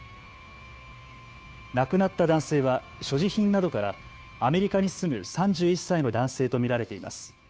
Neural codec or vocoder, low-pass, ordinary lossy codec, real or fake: codec, 16 kHz, 2 kbps, FunCodec, trained on Chinese and English, 25 frames a second; none; none; fake